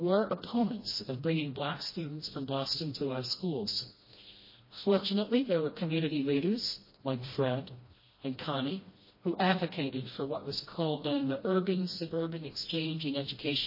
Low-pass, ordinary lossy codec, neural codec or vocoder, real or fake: 5.4 kHz; MP3, 24 kbps; codec, 16 kHz, 1 kbps, FreqCodec, smaller model; fake